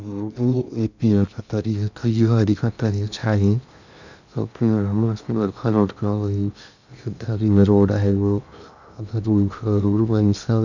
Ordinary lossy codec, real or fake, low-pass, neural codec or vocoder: none; fake; 7.2 kHz; codec, 16 kHz in and 24 kHz out, 0.6 kbps, FocalCodec, streaming, 2048 codes